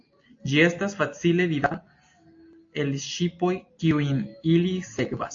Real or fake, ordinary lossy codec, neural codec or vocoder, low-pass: real; AAC, 48 kbps; none; 7.2 kHz